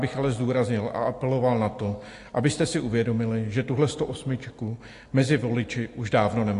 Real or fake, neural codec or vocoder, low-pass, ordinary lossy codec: real; none; 10.8 kHz; AAC, 48 kbps